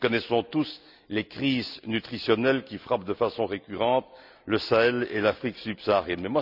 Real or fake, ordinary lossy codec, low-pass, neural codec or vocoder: real; none; 5.4 kHz; none